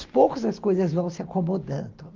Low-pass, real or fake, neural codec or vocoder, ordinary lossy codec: 7.2 kHz; real; none; Opus, 32 kbps